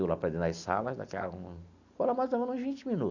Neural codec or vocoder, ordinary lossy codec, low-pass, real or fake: none; none; 7.2 kHz; real